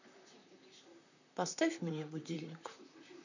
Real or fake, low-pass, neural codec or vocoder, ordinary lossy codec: fake; 7.2 kHz; vocoder, 44.1 kHz, 128 mel bands, Pupu-Vocoder; none